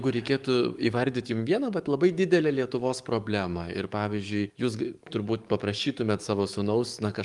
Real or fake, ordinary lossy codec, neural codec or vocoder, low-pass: fake; Opus, 32 kbps; codec, 44.1 kHz, 7.8 kbps, DAC; 10.8 kHz